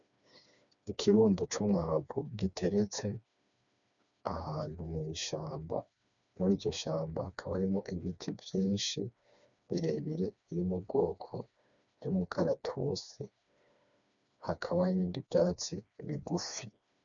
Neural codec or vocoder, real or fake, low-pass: codec, 16 kHz, 2 kbps, FreqCodec, smaller model; fake; 7.2 kHz